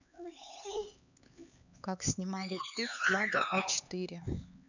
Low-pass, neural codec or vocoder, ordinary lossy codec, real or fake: 7.2 kHz; codec, 16 kHz, 4 kbps, X-Codec, HuBERT features, trained on LibriSpeech; none; fake